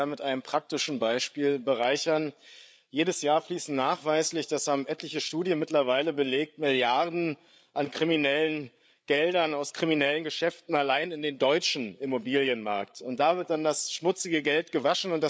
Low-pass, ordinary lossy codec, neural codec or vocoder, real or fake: none; none; codec, 16 kHz, 8 kbps, FreqCodec, larger model; fake